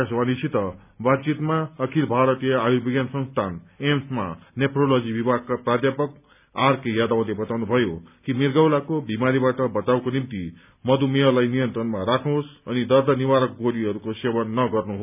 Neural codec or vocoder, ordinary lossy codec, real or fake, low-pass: none; none; real; 3.6 kHz